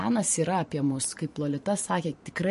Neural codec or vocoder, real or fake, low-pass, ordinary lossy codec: vocoder, 44.1 kHz, 128 mel bands every 512 samples, BigVGAN v2; fake; 14.4 kHz; MP3, 48 kbps